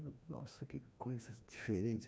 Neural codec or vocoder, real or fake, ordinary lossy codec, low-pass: codec, 16 kHz, 1 kbps, FreqCodec, larger model; fake; none; none